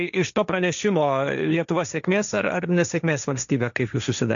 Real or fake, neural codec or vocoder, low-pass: fake; codec, 16 kHz, 1.1 kbps, Voila-Tokenizer; 7.2 kHz